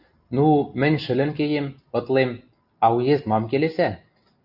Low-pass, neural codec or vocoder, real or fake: 5.4 kHz; none; real